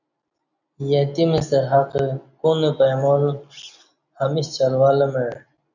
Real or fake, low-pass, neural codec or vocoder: real; 7.2 kHz; none